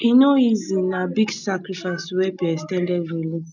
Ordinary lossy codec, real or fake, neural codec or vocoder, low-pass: none; real; none; 7.2 kHz